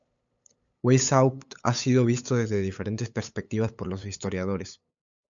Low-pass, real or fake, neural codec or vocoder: 7.2 kHz; fake; codec, 16 kHz, 8 kbps, FunCodec, trained on LibriTTS, 25 frames a second